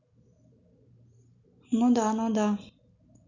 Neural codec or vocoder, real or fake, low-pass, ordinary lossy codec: none; real; 7.2 kHz; none